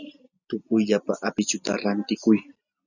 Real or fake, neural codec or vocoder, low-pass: real; none; 7.2 kHz